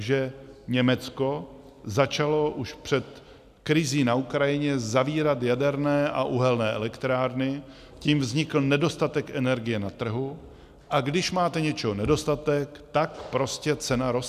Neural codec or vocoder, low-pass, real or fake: none; 14.4 kHz; real